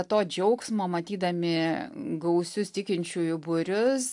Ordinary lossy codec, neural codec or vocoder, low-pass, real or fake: AAC, 64 kbps; none; 10.8 kHz; real